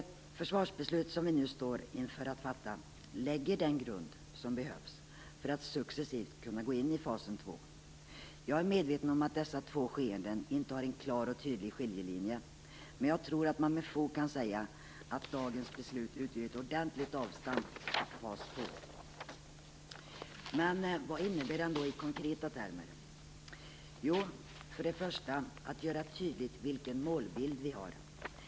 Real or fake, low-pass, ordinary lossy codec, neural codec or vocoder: real; none; none; none